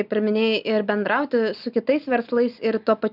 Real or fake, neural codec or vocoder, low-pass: real; none; 5.4 kHz